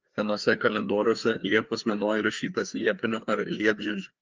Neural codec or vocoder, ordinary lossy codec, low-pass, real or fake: codec, 16 kHz, 2 kbps, FreqCodec, larger model; Opus, 32 kbps; 7.2 kHz; fake